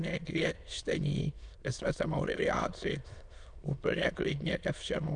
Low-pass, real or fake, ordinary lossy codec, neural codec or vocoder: 9.9 kHz; fake; MP3, 96 kbps; autoencoder, 22.05 kHz, a latent of 192 numbers a frame, VITS, trained on many speakers